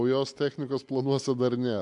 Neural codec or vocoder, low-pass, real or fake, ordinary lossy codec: none; 10.8 kHz; real; AAC, 64 kbps